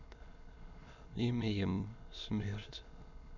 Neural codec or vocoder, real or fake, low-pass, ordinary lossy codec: autoencoder, 22.05 kHz, a latent of 192 numbers a frame, VITS, trained on many speakers; fake; 7.2 kHz; none